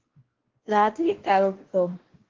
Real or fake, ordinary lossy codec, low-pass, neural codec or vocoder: fake; Opus, 16 kbps; 7.2 kHz; codec, 16 kHz, 1 kbps, X-Codec, WavLM features, trained on Multilingual LibriSpeech